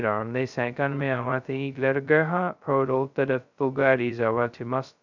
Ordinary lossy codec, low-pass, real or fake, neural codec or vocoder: none; 7.2 kHz; fake; codec, 16 kHz, 0.2 kbps, FocalCodec